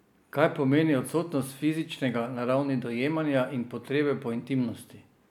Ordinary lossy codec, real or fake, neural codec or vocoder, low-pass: none; real; none; 19.8 kHz